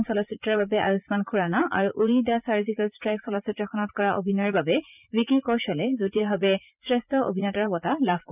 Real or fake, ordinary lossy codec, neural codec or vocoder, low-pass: fake; none; vocoder, 44.1 kHz, 80 mel bands, Vocos; 3.6 kHz